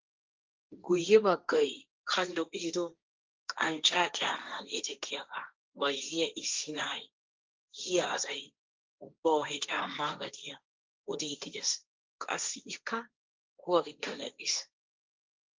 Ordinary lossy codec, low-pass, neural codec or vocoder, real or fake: Opus, 24 kbps; 7.2 kHz; codec, 16 kHz, 1.1 kbps, Voila-Tokenizer; fake